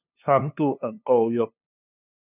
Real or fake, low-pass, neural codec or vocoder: fake; 3.6 kHz; codec, 16 kHz, 2 kbps, FunCodec, trained on LibriTTS, 25 frames a second